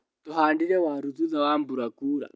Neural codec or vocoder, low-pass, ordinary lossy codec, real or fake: none; none; none; real